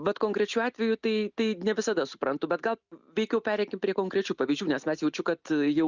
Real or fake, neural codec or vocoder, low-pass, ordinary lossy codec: real; none; 7.2 kHz; Opus, 64 kbps